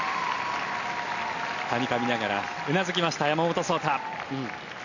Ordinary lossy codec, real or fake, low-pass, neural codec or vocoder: none; real; 7.2 kHz; none